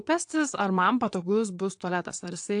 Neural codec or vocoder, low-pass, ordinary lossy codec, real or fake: vocoder, 22.05 kHz, 80 mel bands, WaveNeXt; 9.9 kHz; AAC, 64 kbps; fake